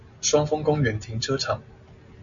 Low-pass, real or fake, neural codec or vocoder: 7.2 kHz; real; none